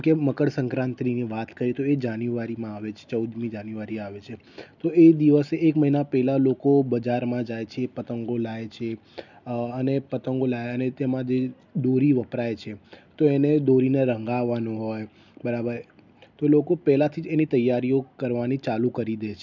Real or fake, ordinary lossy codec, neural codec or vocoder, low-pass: real; none; none; 7.2 kHz